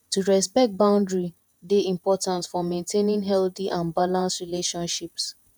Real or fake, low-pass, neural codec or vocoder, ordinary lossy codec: fake; 19.8 kHz; vocoder, 48 kHz, 128 mel bands, Vocos; none